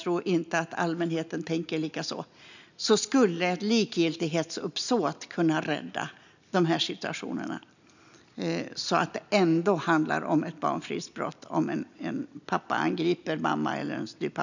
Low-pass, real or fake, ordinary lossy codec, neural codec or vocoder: 7.2 kHz; real; none; none